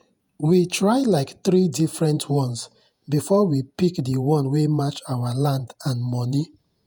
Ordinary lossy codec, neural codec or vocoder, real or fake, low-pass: none; vocoder, 48 kHz, 128 mel bands, Vocos; fake; none